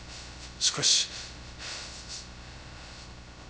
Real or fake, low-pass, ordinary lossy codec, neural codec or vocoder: fake; none; none; codec, 16 kHz, 0.2 kbps, FocalCodec